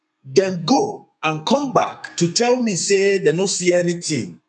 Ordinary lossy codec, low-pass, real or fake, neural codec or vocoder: none; 10.8 kHz; fake; codec, 32 kHz, 1.9 kbps, SNAC